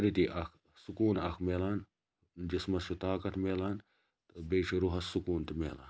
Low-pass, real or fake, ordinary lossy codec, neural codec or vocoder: none; real; none; none